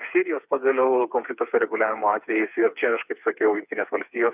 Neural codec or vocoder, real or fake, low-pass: codec, 16 kHz, 4 kbps, FreqCodec, smaller model; fake; 3.6 kHz